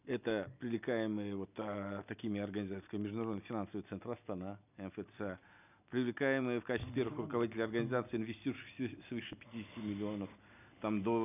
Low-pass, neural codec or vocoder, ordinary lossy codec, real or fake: 3.6 kHz; none; none; real